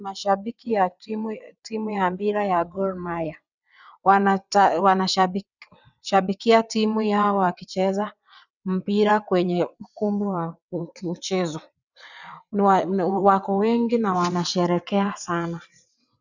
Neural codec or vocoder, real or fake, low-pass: vocoder, 22.05 kHz, 80 mel bands, WaveNeXt; fake; 7.2 kHz